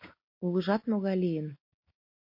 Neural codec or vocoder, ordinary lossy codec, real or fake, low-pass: none; MP3, 32 kbps; real; 5.4 kHz